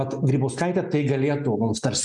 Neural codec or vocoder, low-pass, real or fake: none; 10.8 kHz; real